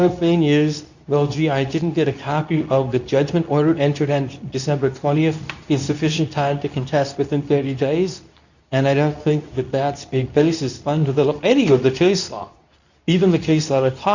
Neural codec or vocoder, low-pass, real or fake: codec, 24 kHz, 0.9 kbps, WavTokenizer, medium speech release version 2; 7.2 kHz; fake